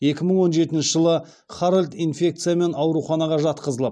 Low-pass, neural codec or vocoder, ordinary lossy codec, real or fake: none; none; none; real